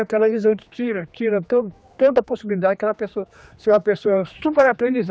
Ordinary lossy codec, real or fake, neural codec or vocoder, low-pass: none; fake; codec, 16 kHz, 2 kbps, X-Codec, HuBERT features, trained on general audio; none